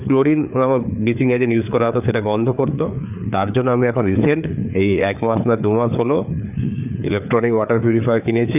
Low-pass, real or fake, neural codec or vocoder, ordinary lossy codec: 3.6 kHz; fake; codec, 16 kHz, 4 kbps, FreqCodec, larger model; none